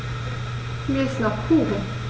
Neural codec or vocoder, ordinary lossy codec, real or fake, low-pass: none; none; real; none